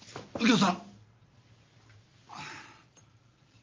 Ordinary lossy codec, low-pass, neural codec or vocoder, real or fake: Opus, 24 kbps; 7.2 kHz; none; real